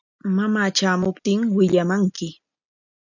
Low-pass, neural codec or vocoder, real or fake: 7.2 kHz; none; real